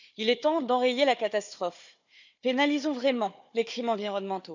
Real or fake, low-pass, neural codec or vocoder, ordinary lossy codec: fake; 7.2 kHz; codec, 16 kHz, 4 kbps, FunCodec, trained on Chinese and English, 50 frames a second; none